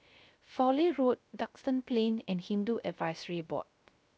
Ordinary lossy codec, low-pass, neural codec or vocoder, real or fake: none; none; codec, 16 kHz, 0.3 kbps, FocalCodec; fake